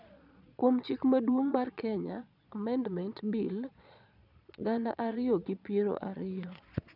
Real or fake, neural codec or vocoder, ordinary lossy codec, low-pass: fake; vocoder, 22.05 kHz, 80 mel bands, Vocos; none; 5.4 kHz